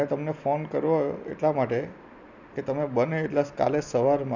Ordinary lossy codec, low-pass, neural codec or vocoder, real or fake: none; 7.2 kHz; none; real